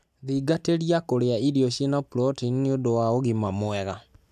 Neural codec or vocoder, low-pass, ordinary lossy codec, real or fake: none; 14.4 kHz; none; real